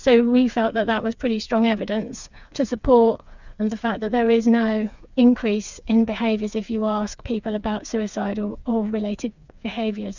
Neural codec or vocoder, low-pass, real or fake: codec, 16 kHz, 4 kbps, FreqCodec, smaller model; 7.2 kHz; fake